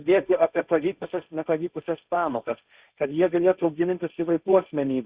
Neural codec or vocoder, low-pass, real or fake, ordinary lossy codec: codec, 16 kHz, 1.1 kbps, Voila-Tokenizer; 3.6 kHz; fake; Opus, 64 kbps